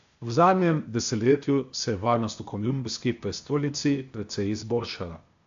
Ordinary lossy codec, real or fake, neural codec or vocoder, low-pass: AAC, 64 kbps; fake; codec, 16 kHz, 0.8 kbps, ZipCodec; 7.2 kHz